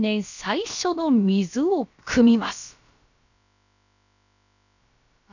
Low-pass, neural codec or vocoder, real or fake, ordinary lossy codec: 7.2 kHz; codec, 16 kHz, about 1 kbps, DyCAST, with the encoder's durations; fake; none